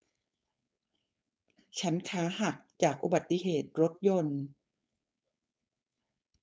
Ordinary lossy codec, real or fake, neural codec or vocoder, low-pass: none; fake; codec, 16 kHz, 4.8 kbps, FACodec; none